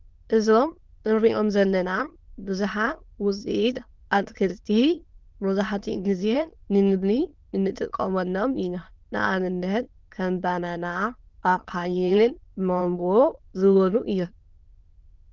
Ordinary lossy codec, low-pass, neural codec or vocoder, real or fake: Opus, 32 kbps; 7.2 kHz; autoencoder, 22.05 kHz, a latent of 192 numbers a frame, VITS, trained on many speakers; fake